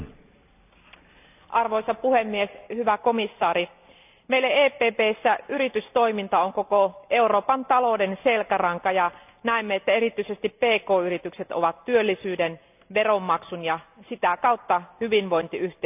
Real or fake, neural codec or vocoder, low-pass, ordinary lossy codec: real; none; 3.6 kHz; none